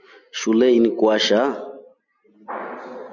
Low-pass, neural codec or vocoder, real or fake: 7.2 kHz; none; real